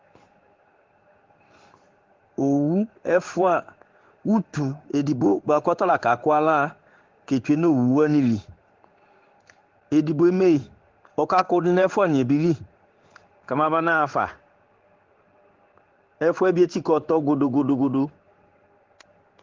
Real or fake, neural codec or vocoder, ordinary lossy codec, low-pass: fake; codec, 16 kHz in and 24 kHz out, 1 kbps, XY-Tokenizer; Opus, 16 kbps; 7.2 kHz